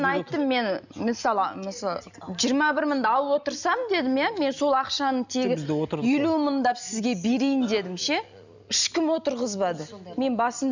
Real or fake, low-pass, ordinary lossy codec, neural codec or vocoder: real; 7.2 kHz; none; none